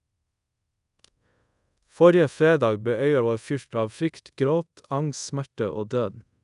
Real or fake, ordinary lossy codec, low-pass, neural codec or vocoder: fake; none; 10.8 kHz; codec, 24 kHz, 0.5 kbps, DualCodec